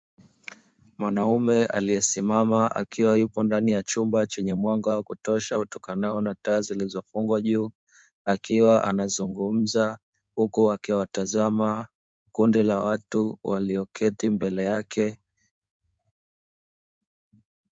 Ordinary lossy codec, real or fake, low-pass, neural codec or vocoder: MP3, 64 kbps; fake; 9.9 kHz; codec, 16 kHz in and 24 kHz out, 2.2 kbps, FireRedTTS-2 codec